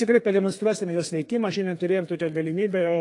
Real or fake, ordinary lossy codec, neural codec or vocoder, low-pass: fake; AAC, 48 kbps; codec, 32 kHz, 1.9 kbps, SNAC; 10.8 kHz